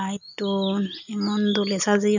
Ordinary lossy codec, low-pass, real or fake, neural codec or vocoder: none; 7.2 kHz; real; none